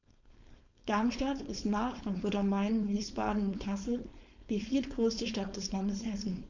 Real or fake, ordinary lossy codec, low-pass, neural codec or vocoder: fake; none; 7.2 kHz; codec, 16 kHz, 4.8 kbps, FACodec